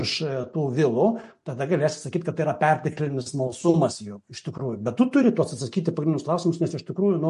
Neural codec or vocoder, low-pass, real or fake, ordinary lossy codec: none; 14.4 kHz; real; MP3, 48 kbps